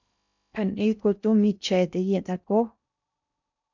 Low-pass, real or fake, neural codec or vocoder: 7.2 kHz; fake; codec, 16 kHz in and 24 kHz out, 0.6 kbps, FocalCodec, streaming, 2048 codes